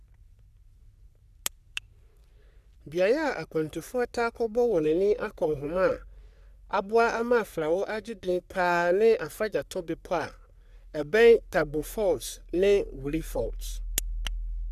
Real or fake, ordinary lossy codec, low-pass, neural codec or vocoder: fake; none; 14.4 kHz; codec, 44.1 kHz, 3.4 kbps, Pupu-Codec